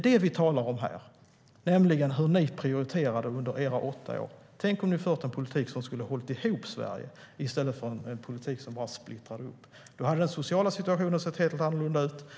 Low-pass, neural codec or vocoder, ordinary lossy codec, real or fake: none; none; none; real